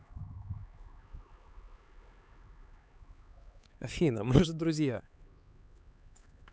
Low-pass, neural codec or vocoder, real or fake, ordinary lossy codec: none; codec, 16 kHz, 4 kbps, X-Codec, HuBERT features, trained on LibriSpeech; fake; none